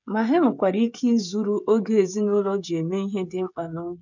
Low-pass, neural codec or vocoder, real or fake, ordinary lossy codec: 7.2 kHz; codec, 16 kHz, 16 kbps, FreqCodec, smaller model; fake; none